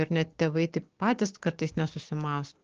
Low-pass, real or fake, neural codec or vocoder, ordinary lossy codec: 7.2 kHz; fake; codec, 16 kHz, 6 kbps, DAC; Opus, 16 kbps